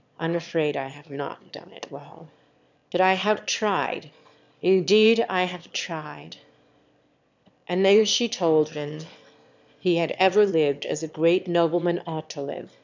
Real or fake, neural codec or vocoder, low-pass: fake; autoencoder, 22.05 kHz, a latent of 192 numbers a frame, VITS, trained on one speaker; 7.2 kHz